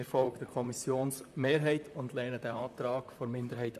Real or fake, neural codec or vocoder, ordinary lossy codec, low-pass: fake; vocoder, 44.1 kHz, 128 mel bands, Pupu-Vocoder; none; 14.4 kHz